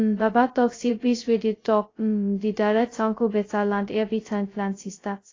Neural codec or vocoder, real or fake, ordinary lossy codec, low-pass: codec, 16 kHz, 0.2 kbps, FocalCodec; fake; AAC, 32 kbps; 7.2 kHz